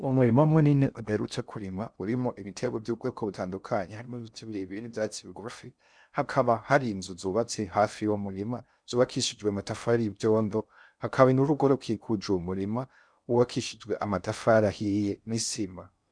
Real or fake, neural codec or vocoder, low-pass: fake; codec, 16 kHz in and 24 kHz out, 0.6 kbps, FocalCodec, streaming, 2048 codes; 9.9 kHz